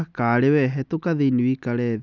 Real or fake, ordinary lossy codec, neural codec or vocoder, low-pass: real; none; none; 7.2 kHz